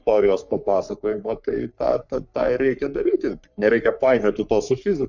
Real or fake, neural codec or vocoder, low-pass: fake; codec, 44.1 kHz, 3.4 kbps, Pupu-Codec; 7.2 kHz